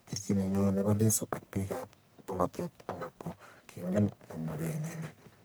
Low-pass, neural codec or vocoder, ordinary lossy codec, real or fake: none; codec, 44.1 kHz, 1.7 kbps, Pupu-Codec; none; fake